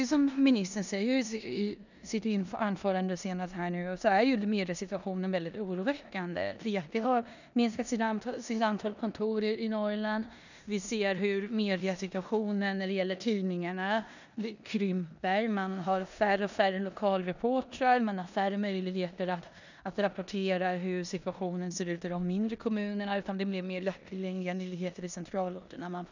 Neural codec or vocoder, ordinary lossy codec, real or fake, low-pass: codec, 16 kHz in and 24 kHz out, 0.9 kbps, LongCat-Audio-Codec, four codebook decoder; none; fake; 7.2 kHz